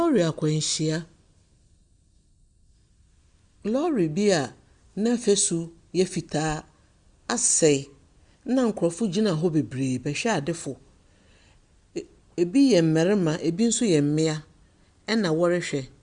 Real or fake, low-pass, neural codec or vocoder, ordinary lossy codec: real; 9.9 kHz; none; Opus, 64 kbps